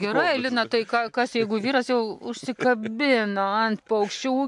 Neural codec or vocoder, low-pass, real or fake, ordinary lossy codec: none; 10.8 kHz; real; MP3, 64 kbps